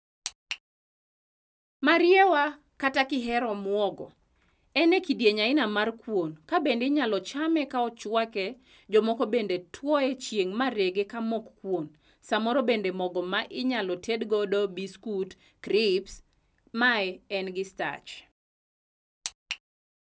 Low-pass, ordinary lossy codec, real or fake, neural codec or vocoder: none; none; real; none